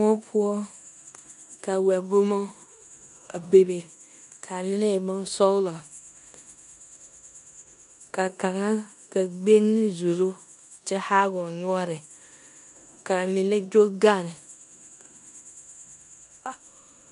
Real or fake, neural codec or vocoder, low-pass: fake; codec, 16 kHz in and 24 kHz out, 0.9 kbps, LongCat-Audio-Codec, four codebook decoder; 10.8 kHz